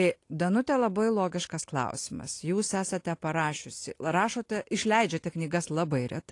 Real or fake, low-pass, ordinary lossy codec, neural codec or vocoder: real; 10.8 kHz; AAC, 48 kbps; none